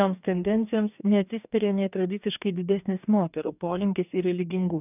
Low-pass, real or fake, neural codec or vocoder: 3.6 kHz; fake; codec, 44.1 kHz, 2.6 kbps, DAC